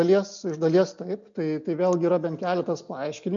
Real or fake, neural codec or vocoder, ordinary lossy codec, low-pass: real; none; MP3, 64 kbps; 7.2 kHz